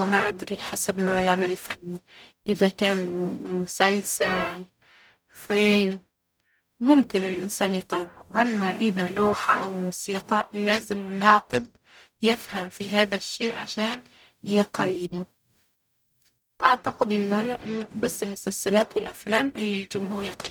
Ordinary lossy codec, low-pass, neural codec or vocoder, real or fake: none; none; codec, 44.1 kHz, 0.9 kbps, DAC; fake